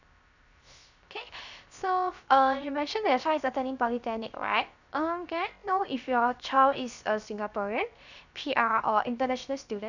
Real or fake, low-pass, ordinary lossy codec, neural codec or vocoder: fake; 7.2 kHz; none; codec, 16 kHz, 0.7 kbps, FocalCodec